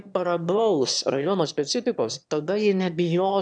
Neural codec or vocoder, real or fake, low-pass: autoencoder, 22.05 kHz, a latent of 192 numbers a frame, VITS, trained on one speaker; fake; 9.9 kHz